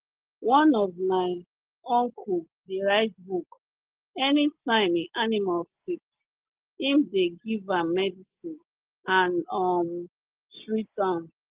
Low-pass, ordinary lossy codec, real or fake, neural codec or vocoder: 3.6 kHz; Opus, 16 kbps; real; none